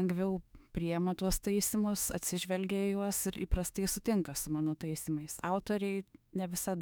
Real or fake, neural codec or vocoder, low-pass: fake; autoencoder, 48 kHz, 32 numbers a frame, DAC-VAE, trained on Japanese speech; 19.8 kHz